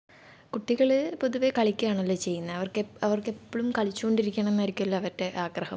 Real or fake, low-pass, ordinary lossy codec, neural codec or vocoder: real; none; none; none